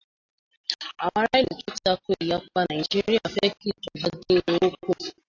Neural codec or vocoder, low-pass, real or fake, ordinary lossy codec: none; 7.2 kHz; real; AAC, 48 kbps